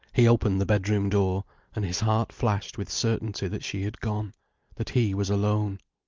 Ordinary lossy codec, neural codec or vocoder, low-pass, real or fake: Opus, 32 kbps; none; 7.2 kHz; real